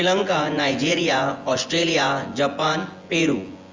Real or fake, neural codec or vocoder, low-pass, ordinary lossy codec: fake; vocoder, 24 kHz, 100 mel bands, Vocos; 7.2 kHz; Opus, 32 kbps